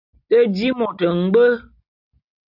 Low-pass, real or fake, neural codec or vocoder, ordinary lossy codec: 5.4 kHz; real; none; AAC, 48 kbps